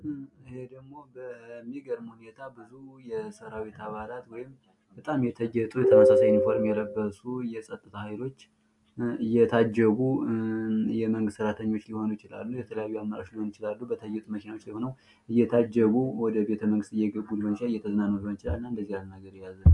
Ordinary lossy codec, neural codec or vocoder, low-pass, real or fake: MP3, 64 kbps; none; 10.8 kHz; real